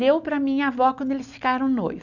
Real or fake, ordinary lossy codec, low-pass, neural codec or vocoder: real; none; 7.2 kHz; none